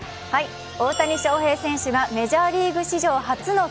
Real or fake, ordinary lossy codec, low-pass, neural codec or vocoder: real; none; none; none